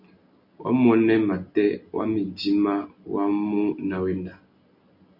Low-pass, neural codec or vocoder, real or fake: 5.4 kHz; none; real